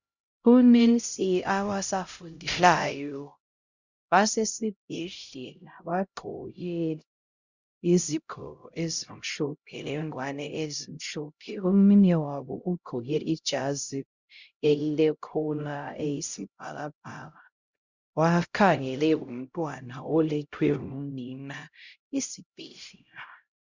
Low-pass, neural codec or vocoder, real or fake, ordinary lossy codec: 7.2 kHz; codec, 16 kHz, 0.5 kbps, X-Codec, HuBERT features, trained on LibriSpeech; fake; Opus, 64 kbps